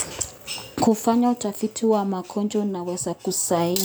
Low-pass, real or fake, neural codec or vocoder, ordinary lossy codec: none; real; none; none